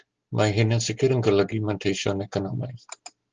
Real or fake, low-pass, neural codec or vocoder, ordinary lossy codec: real; 7.2 kHz; none; Opus, 16 kbps